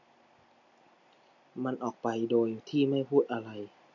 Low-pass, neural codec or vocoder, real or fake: 7.2 kHz; none; real